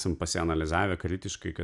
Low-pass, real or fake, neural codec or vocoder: 10.8 kHz; real; none